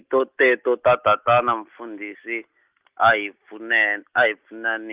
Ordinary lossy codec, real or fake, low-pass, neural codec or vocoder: Opus, 32 kbps; real; 3.6 kHz; none